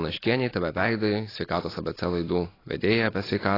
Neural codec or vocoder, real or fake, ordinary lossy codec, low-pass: none; real; AAC, 24 kbps; 5.4 kHz